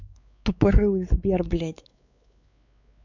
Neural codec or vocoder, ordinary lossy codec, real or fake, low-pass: codec, 16 kHz, 4 kbps, X-Codec, WavLM features, trained on Multilingual LibriSpeech; none; fake; 7.2 kHz